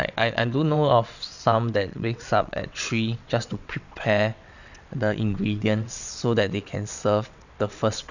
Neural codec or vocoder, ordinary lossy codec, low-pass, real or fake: vocoder, 22.05 kHz, 80 mel bands, WaveNeXt; none; 7.2 kHz; fake